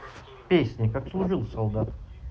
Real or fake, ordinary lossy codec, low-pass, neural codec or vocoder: real; none; none; none